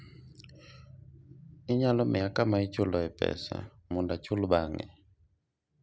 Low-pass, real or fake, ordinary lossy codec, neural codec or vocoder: none; real; none; none